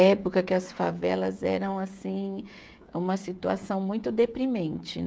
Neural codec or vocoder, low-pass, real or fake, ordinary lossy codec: codec, 16 kHz, 16 kbps, FreqCodec, smaller model; none; fake; none